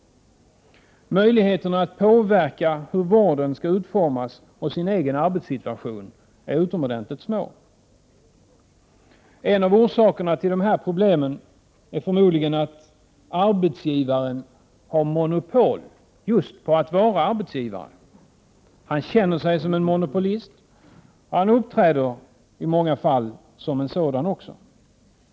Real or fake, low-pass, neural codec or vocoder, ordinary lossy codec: real; none; none; none